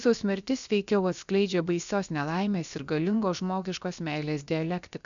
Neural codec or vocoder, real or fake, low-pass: codec, 16 kHz, 0.7 kbps, FocalCodec; fake; 7.2 kHz